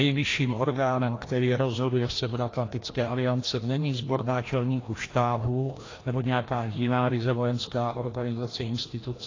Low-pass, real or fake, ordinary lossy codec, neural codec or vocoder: 7.2 kHz; fake; AAC, 32 kbps; codec, 16 kHz, 1 kbps, FreqCodec, larger model